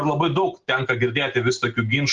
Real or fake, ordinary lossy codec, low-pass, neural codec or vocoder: real; Opus, 16 kbps; 7.2 kHz; none